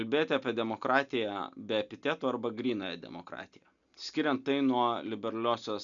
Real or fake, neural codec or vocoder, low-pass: real; none; 7.2 kHz